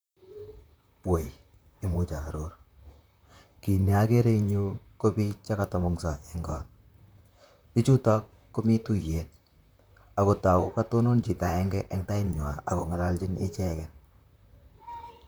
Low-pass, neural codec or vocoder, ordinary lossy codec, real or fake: none; vocoder, 44.1 kHz, 128 mel bands, Pupu-Vocoder; none; fake